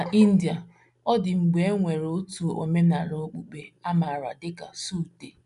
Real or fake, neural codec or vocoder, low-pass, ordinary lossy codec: real; none; 10.8 kHz; none